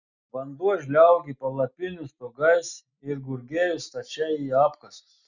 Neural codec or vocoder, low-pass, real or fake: none; 7.2 kHz; real